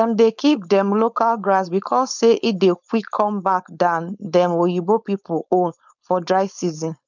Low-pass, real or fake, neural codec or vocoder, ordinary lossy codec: 7.2 kHz; fake; codec, 16 kHz, 4.8 kbps, FACodec; none